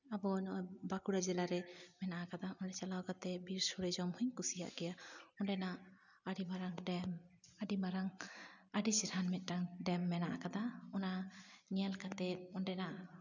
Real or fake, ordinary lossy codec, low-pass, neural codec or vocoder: real; none; 7.2 kHz; none